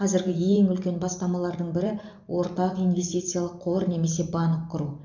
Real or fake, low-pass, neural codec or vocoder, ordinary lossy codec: fake; 7.2 kHz; vocoder, 44.1 kHz, 128 mel bands every 512 samples, BigVGAN v2; none